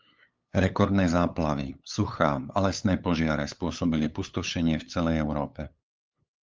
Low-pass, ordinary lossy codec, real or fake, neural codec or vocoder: 7.2 kHz; Opus, 24 kbps; fake; codec, 16 kHz, 8 kbps, FunCodec, trained on LibriTTS, 25 frames a second